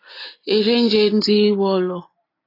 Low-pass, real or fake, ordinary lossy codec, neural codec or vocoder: 5.4 kHz; real; AAC, 24 kbps; none